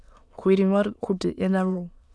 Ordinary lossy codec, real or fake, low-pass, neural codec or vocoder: none; fake; none; autoencoder, 22.05 kHz, a latent of 192 numbers a frame, VITS, trained on many speakers